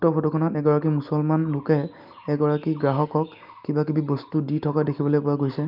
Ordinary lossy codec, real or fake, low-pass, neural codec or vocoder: Opus, 32 kbps; real; 5.4 kHz; none